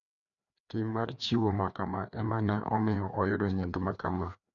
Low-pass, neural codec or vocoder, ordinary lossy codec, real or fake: 7.2 kHz; codec, 16 kHz, 2 kbps, FreqCodec, larger model; none; fake